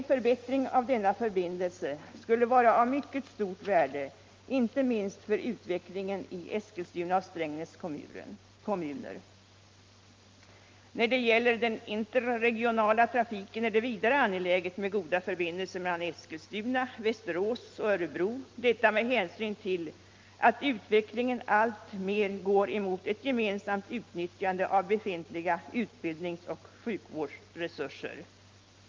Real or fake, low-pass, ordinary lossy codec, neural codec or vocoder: real; 7.2 kHz; Opus, 32 kbps; none